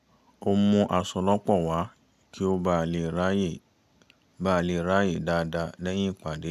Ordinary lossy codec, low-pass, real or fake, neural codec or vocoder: none; 14.4 kHz; real; none